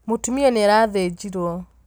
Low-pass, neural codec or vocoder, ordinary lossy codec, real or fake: none; none; none; real